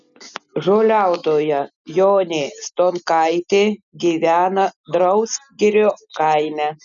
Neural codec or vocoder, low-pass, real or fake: none; 7.2 kHz; real